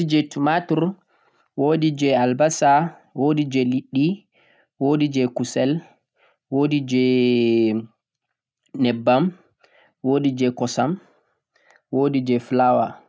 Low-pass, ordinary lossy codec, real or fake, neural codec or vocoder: none; none; real; none